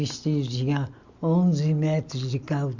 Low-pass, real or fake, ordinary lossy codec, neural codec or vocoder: 7.2 kHz; real; Opus, 64 kbps; none